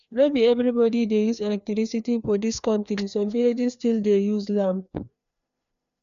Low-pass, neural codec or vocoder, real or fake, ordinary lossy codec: 7.2 kHz; codec, 16 kHz, 2 kbps, FreqCodec, larger model; fake; none